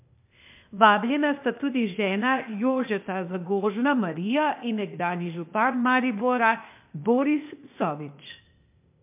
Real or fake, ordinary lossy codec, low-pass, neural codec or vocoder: fake; MP3, 32 kbps; 3.6 kHz; codec, 16 kHz, 0.8 kbps, ZipCodec